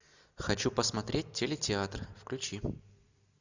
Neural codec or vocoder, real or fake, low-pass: none; real; 7.2 kHz